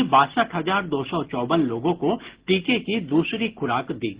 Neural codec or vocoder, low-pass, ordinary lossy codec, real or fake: none; 3.6 kHz; Opus, 16 kbps; real